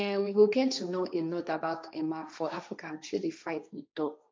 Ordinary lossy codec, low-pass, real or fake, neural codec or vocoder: none; none; fake; codec, 16 kHz, 1.1 kbps, Voila-Tokenizer